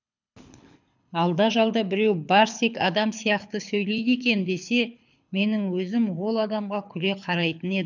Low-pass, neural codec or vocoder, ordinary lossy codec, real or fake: 7.2 kHz; codec, 24 kHz, 6 kbps, HILCodec; none; fake